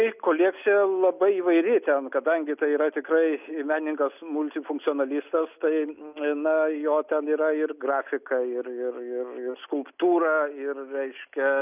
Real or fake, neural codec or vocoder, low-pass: real; none; 3.6 kHz